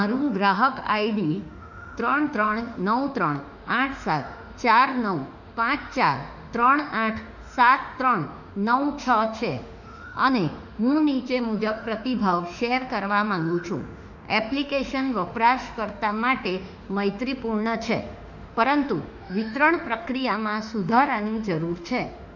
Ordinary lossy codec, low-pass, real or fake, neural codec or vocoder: none; 7.2 kHz; fake; autoencoder, 48 kHz, 32 numbers a frame, DAC-VAE, trained on Japanese speech